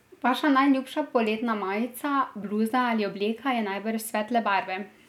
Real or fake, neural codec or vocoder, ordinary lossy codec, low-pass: real; none; none; 19.8 kHz